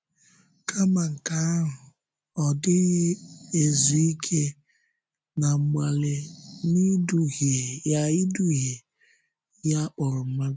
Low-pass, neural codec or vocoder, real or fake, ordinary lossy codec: none; none; real; none